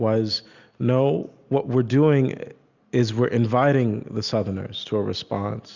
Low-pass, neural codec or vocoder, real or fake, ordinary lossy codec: 7.2 kHz; none; real; Opus, 64 kbps